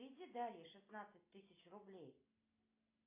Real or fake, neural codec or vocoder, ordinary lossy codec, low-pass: real; none; AAC, 24 kbps; 3.6 kHz